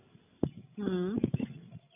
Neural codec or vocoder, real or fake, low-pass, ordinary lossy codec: codec, 16 kHz, 16 kbps, FreqCodec, larger model; fake; 3.6 kHz; none